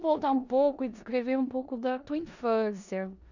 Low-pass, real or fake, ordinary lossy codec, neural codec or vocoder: 7.2 kHz; fake; none; codec, 16 kHz in and 24 kHz out, 0.9 kbps, LongCat-Audio-Codec, four codebook decoder